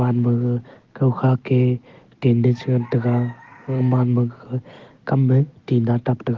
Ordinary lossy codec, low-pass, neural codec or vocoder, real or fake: Opus, 16 kbps; 7.2 kHz; none; real